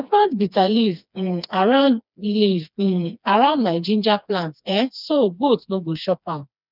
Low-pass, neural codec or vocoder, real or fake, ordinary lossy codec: 5.4 kHz; codec, 16 kHz, 2 kbps, FreqCodec, smaller model; fake; none